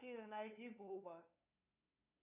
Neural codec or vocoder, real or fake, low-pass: codec, 16 kHz, 2 kbps, FunCodec, trained on LibriTTS, 25 frames a second; fake; 3.6 kHz